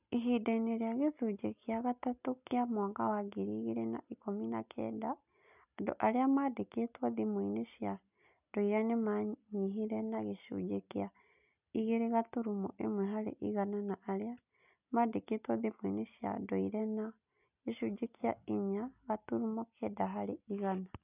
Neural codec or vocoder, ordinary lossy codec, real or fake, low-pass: none; none; real; 3.6 kHz